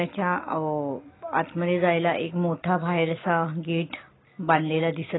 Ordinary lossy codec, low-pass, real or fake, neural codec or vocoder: AAC, 16 kbps; 7.2 kHz; real; none